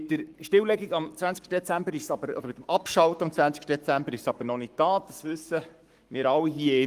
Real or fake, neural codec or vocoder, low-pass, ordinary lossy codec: fake; codec, 44.1 kHz, 7.8 kbps, Pupu-Codec; 14.4 kHz; Opus, 32 kbps